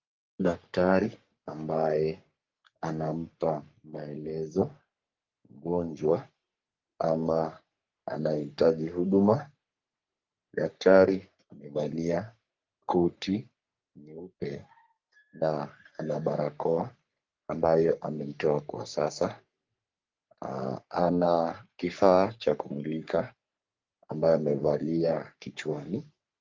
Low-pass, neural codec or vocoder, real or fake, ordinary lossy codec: 7.2 kHz; codec, 44.1 kHz, 3.4 kbps, Pupu-Codec; fake; Opus, 24 kbps